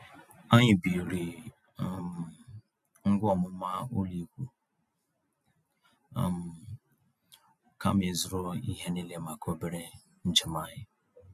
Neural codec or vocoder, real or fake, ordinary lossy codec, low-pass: none; real; none; 14.4 kHz